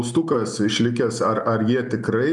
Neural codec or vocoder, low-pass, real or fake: none; 10.8 kHz; real